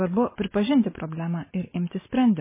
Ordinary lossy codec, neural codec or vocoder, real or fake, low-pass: MP3, 16 kbps; none; real; 3.6 kHz